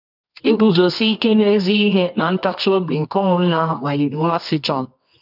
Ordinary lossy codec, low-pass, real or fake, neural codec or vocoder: none; 5.4 kHz; fake; codec, 24 kHz, 0.9 kbps, WavTokenizer, medium music audio release